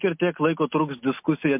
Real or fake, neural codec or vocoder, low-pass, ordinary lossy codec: real; none; 3.6 kHz; MP3, 32 kbps